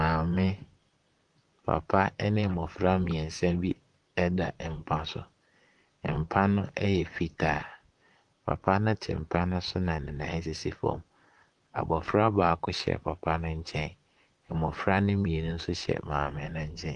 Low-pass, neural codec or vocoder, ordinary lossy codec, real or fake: 10.8 kHz; vocoder, 44.1 kHz, 128 mel bands, Pupu-Vocoder; Opus, 32 kbps; fake